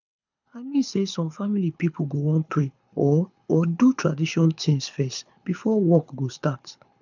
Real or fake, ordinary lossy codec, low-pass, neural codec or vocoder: fake; none; 7.2 kHz; codec, 24 kHz, 6 kbps, HILCodec